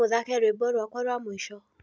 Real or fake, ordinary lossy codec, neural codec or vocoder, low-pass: real; none; none; none